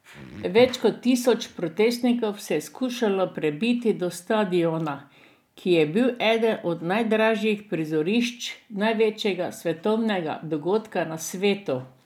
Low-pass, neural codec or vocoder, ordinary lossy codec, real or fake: 19.8 kHz; none; none; real